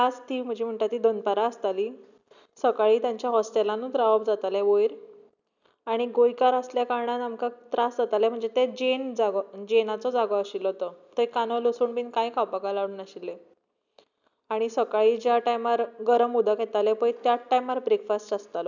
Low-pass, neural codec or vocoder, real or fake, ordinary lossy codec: 7.2 kHz; none; real; none